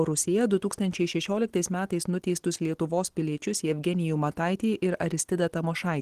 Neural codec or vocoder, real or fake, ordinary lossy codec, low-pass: none; real; Opus, 16 kbps; 14.4 kHz